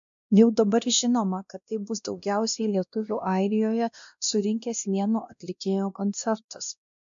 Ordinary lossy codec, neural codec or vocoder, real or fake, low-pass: MP3, 64 kbps; codec, 16 kHz, 1 kbps, X-Codec, WavLM features, trained on Multilingual LibriSpeech; fake; 7.2 kHz